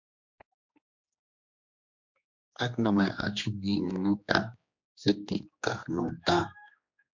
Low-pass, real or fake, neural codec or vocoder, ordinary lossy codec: 7.2 kHz; fake; codec, 16 kHz, 2 kbps, X-Codec, HuBERT features, trained on general audio; MP3, 48 kbps